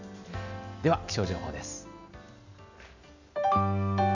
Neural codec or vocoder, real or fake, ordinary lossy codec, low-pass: none; real; none; 7.2 kHz